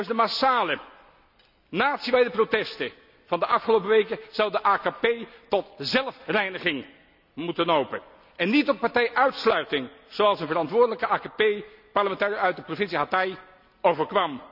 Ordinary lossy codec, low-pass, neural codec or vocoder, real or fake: none; 5.4 kHz; none; real